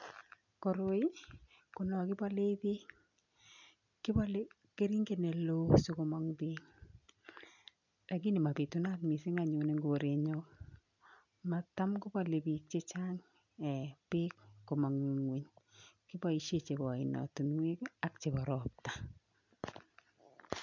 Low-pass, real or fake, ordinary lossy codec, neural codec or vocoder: 7.2 kHz; real; none; none